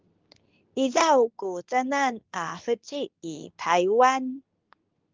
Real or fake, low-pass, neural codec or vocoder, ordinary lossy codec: fake; 7.2 kHz; codec, 24 kHz, 0.9 kbps, WavTokenizer, medium speech release version 2; Opus, 32 kbps